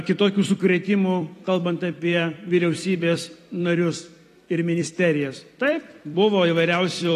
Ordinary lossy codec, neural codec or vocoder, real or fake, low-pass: AAC, 48 kbps; vocoder, 44.1 kHz, 128 mel bands every 256 samples, BigVGAN v2; fake; 14.4 kHz